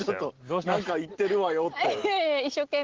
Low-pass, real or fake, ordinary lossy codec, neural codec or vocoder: 7.2 kHz; real; Opus, 16 kbps; none